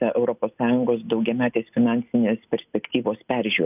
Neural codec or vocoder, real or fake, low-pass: none; real; 3.6 kHz